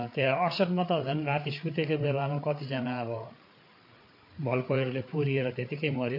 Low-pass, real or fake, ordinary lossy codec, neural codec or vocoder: 5.4 kHz; fake; MP3, 32 kbps; codec, 16 kHz, 8 kbps, FreqCodec, larger model